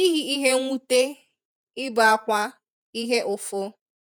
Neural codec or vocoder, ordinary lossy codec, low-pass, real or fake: vocoder, 48 kHz, 128 mel bands, Vocos; none; none; fake